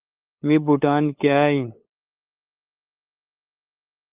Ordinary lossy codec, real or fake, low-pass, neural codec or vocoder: Opus, 24 kbps; fake; 3.6 kHz; codec, 16 kHz, 8 kbps, FunCodec, trained on LibriTTS, 25 frames a second